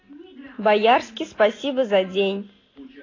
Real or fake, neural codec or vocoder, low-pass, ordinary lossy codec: real; none; 7.2 kHz; AAC, 32 kbps